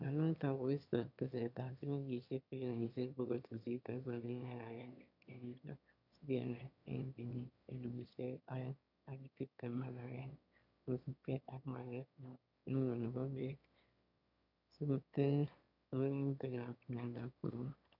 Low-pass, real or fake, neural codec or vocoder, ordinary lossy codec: 5.4 kHz; fake; autoencoder, 22.05 kHz, a latent of 192 numbers a frame, VITS, trained on one speaker; none